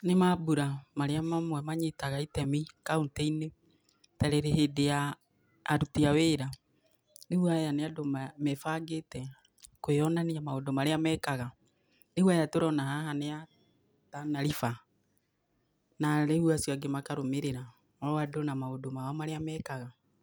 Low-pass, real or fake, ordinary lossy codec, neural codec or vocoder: none; real; none; none